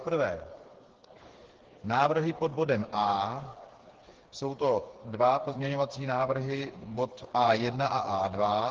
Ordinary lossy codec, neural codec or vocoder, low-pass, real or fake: Opus, 16 kbps; codec, 16 kHz, 4 kbps, FreqCodec, smaller model; 7.2 kHz; fake